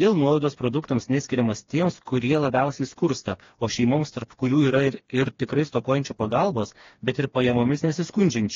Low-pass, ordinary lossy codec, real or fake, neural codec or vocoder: 7.2 kHz; AAC, 32 kbps; fake; codec, 16 kHz, 2 kbps, FreqCodec, smaller model